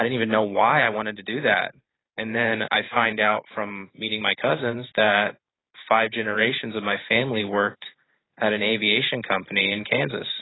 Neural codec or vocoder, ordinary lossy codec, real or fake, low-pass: vocoder, 44.1 kHz, 128 mel bands every 512 samples, BigVGAN v2; AAC, 16 kbps; fake; 7.2 kHz